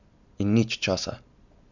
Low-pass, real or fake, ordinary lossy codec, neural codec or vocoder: 7.2 kHz; real; none; none